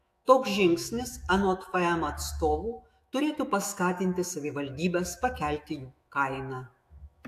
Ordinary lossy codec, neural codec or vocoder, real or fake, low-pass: AAC, 64 kbps; autoencoder, 48 kHz, 128 numbers a frame, DAC-VAE, trained on Japanese speech; fake; 14.4 kHz